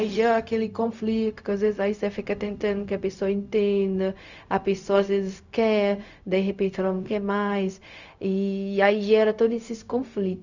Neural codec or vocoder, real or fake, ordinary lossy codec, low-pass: codec, 16 kHz, 0.4 kbps, LongCat-Audio-Codec; fake; none; 7.2 kHz